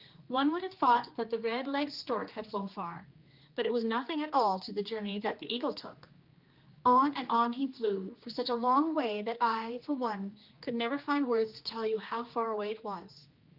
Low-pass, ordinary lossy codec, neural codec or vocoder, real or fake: 5.4 kHz; Opus, 24 kbps; codec, 16 kHz, 2 kbps, X-Codec, HuBERT features, trained on general audio; fake